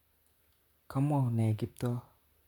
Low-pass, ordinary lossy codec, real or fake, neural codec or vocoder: 19.8 kHz; none; fake; vocoder, 44.1 kHz, 128 mel bands, Pupu-Vocoder